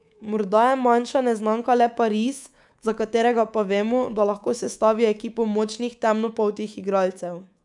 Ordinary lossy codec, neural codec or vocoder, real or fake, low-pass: none; codec, 24 kHz, 3.1 kbps, DualCodec; fake; 10.8 kHz